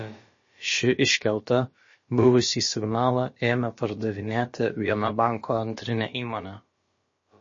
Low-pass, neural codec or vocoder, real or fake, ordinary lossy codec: 7.2 kHz; codec, 16 kHz, about 1 kbps, DyCAST, with the encoder's durations; fake; MP3, 32 kbps